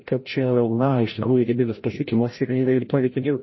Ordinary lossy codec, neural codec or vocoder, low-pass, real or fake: MP3, 24 kbps; codec, 16 kHz, 0.5 kbps, FreqCodec, larger model; 7.2 kHz; fake